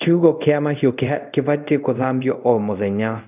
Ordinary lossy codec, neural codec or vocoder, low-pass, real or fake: none; codec, 24 kHz, 0.5 kbps, DualCodec; 3.6 kHz; fake